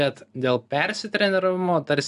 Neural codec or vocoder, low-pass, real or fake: none; 10.8 kHz; real